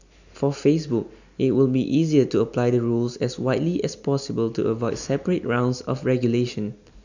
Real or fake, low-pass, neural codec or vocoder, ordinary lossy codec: real; 7.2 kHz; none; none